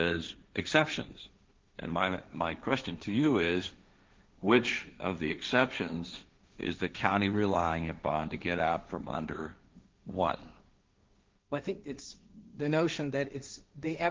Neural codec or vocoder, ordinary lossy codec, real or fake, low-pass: codec, 16 kHz, 1.1 kbps, Voila-Tokenizer; Opus, 24 kbps; fake; 7.2 kHz